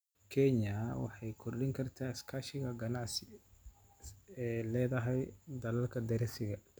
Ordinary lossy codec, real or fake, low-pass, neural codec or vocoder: none; real; none; none